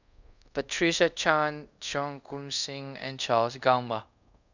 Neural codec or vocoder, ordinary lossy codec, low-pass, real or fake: codec, 24 kHz, 0.5 kbps, DualCodec; none; 7.2 kHz; fake